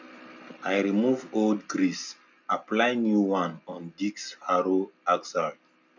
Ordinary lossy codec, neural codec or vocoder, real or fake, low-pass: none; none; real; 7.2 kHz